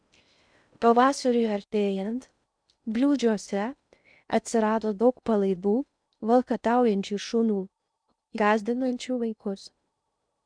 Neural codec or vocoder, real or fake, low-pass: codec, 16 kHz in and 24 kHz out, 0.6 kbps, FocalCodec, streaming, 2048 codes; fake; 9.9 kHz